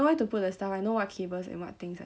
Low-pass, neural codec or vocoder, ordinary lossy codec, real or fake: none; none; none; real